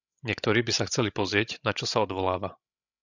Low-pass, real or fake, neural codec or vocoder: 7.2 kHz; fake; codec, 16 kHz, 16 kbps, FreqCodec, larger model